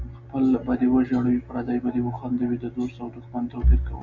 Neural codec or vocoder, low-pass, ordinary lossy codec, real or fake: none; 7.2 kHz; MP3, 64 kbps; real